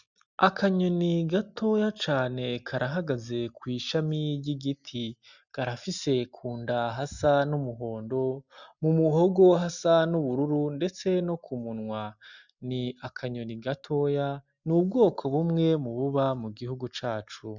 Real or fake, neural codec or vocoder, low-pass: real; none; 7.2 kHz